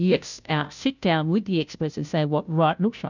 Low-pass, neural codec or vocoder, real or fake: 7.2 kHz; codec, 16 kHz, 0.5 kbps, FunCodec, trained on Chinese and English, 25 frames a second; fake